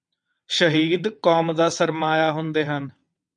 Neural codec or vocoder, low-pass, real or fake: vocoder, 22.05 kHz, 80 mel bands, WaveNeXt; 9.9 kHz; fake